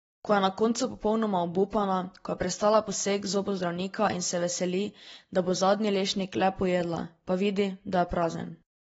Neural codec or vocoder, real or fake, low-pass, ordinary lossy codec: none; real; 19.8 kHz; AAC, 24 kbps